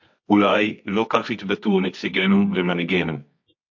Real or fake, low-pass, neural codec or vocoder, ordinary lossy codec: fake; 7.2 kHz; codec, 24 kHz, 0.9 kbps, WavTokenizer, medium music audio release; MP3, 48 kbps